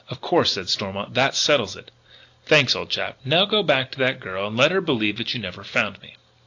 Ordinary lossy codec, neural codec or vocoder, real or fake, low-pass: AAC, 48 kbps; none; real; 7.2 kHz